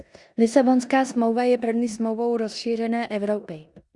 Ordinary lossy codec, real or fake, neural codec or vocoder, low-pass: Opus, 64 kbps; fake; codec, 16 kHz in and 24 kHz out, 0.9 kbps, LongCat-Audio-Codec, four codebook decoder; 10.8 kHz